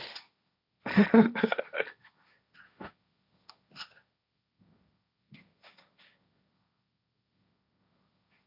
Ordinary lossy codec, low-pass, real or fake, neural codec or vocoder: none; 5.4 kHz; fake; codec, 16 kHz, 1.1 kbps, Voila-Tokenizer